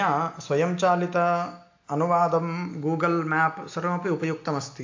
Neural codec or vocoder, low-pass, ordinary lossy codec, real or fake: none; 7.2 kHz; AAC, 48 kbps; real